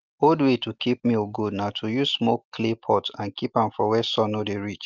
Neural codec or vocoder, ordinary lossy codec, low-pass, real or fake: none; Opus, 24 kbps; 7.2 kHz; real